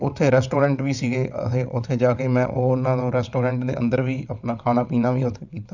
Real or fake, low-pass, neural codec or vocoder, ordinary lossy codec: fake; 7.2 kHz; vocoder, 22.05 kHz, 80 mel bands, WaveNeXt; none